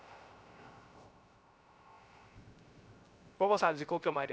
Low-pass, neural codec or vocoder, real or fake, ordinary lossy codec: none; codec, 16 kHz, 0.3 kbps, FocalCodec; fake; none